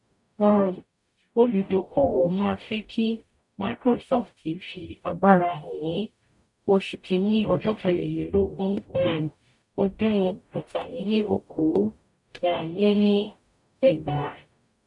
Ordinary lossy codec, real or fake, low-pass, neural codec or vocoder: none; fake; 10.8 kHz; codec, 44.1 kHz, 0.9 kbps, DAC